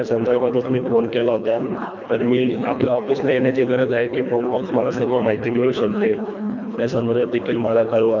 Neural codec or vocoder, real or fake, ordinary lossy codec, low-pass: codec, 24 kHz, 1.5 kbps, HILCodec; fake; none; 7.2 kHz